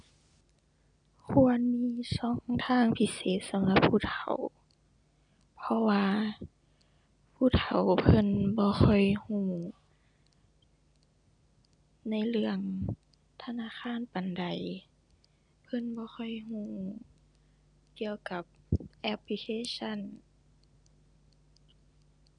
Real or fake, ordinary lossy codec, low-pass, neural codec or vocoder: real; none; 9.9 kHz; none